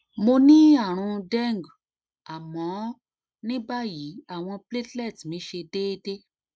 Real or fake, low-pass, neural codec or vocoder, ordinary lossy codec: real; none; none; none